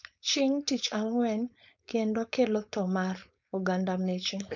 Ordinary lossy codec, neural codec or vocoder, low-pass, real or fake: none; codec, 16 kHz, 4.8 kbps, FACodec; 7.2 kHz; fake